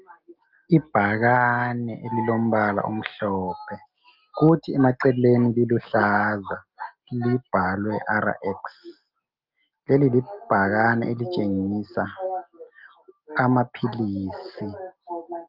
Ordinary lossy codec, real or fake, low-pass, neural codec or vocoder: Opus, 24 kbps; real; 5.4 kHz; none